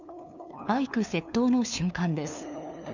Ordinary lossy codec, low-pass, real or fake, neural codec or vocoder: none; 7.2 kHz; fake; codec, 16 kHz, 2 kbps, FunCodec, trained on LibriTTS, 25 frames a second